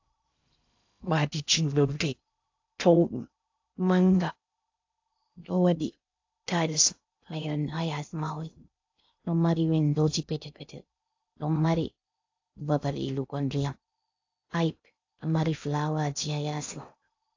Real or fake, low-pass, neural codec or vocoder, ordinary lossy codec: fake; 7.2 kHz; codec, 16 kHz in and 24 kHz out, 0.6 kbps, FocalCodec, streaming, 2048 codes; AAC, 48 kbps